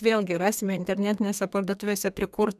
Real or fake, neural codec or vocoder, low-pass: fake; codec, 32 kHz, 1.9 kbps, SNAC; 14.4 kHz